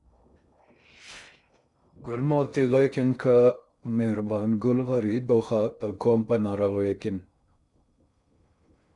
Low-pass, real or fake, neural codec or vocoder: 10.8 kHz; fake; codec, 16 kHz in and 24 kHz out, 0.6 kbps, FocalCodec, streaming, 2048 codes